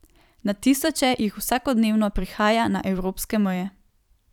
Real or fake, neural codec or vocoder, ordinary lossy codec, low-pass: fake; vocoder, 44.1 kHz, 128 mel bands every 256 samples, BigVGAN v2; none; 19.8 kHz